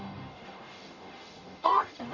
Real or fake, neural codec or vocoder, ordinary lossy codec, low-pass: fake; codec, 44.1 kHz, 0.9 kbps, DAC; none; 7.2 kHz